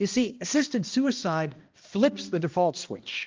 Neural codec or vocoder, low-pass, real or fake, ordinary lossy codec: codec, 16 kHz, 1 kbps, X-Codec, HuBERT features, trained on balanced general audio; 7.2 kHz; fake; Opus, 32 kbps